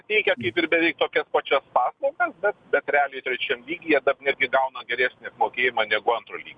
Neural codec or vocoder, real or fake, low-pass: none; real; 9.9 kHz